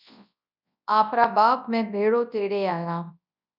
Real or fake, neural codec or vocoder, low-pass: fake; codec, 24 kHz, 0.9 kbps, WavTokenizer, large speech release; 5.4 kHz